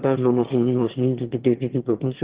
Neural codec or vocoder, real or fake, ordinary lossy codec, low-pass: autoencoder, 22.05 kHz, a latent of 192 numbers a frame, VITS, trained on one speaker; fake; Opus, 16 kbps; 3.6 kHz